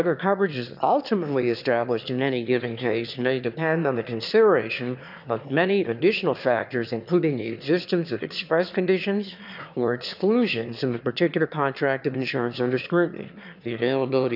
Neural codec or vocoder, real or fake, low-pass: autoencoder, 22.05 kHz, a latent of 192 numbers a frame, VITS, trained on one speaker; fake; 5.4 kHz